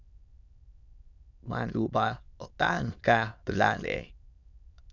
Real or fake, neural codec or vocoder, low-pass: fake; autoencoder, 22.05 kHz, a latent of 192 numbers a frame, VITS, trained on many speakers; 7.2 kHz